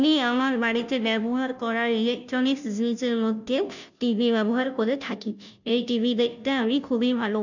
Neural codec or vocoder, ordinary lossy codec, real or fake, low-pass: codec, 16 kHz, 0.5 kbps, FunCodec, trained on Chinese and English, 25 frames a second; none; fake; 7.2 kHz